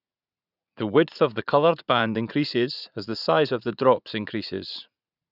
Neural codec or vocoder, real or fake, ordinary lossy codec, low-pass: vocoder, 44.1 kHz, 80 mel bands, Vocos; fake; none; 5.4 kHz